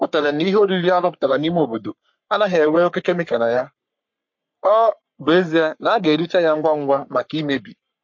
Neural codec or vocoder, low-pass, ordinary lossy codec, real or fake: codec, 44.1 kHz, 3.4 kbps, Pupu-Codec; 7.2 kHz; MP3, 48 kbps; fake